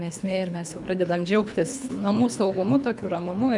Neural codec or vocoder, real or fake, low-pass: codec, 24 kHz, 3 kbps, HILCodec; fake; 10.8 kHz